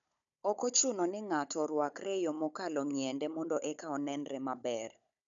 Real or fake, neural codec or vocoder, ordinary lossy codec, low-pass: fake; codec, 16 kHz, 16 kbps, FunCodec, trained on Chinese and English, 50 frames a second; none; 7.2 kHz